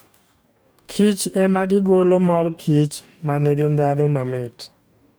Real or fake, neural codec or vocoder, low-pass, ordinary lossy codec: fake; codec, 44.1 kHz, 2.6 kbps, DAC; none; none